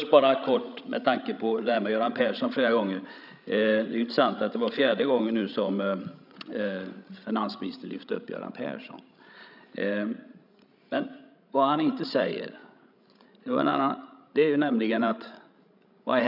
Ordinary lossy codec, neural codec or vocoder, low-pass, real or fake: none; codec, 16 kHz, 16 kbps, FreqCodec, larger model; 5.4 kHz; fake